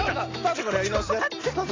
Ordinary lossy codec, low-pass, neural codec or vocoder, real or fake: AAC, 32 kbps; 7.2 kHz; none; real